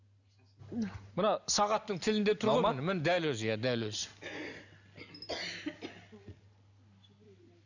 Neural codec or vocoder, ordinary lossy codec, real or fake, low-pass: none; none; real; 7.2 kHz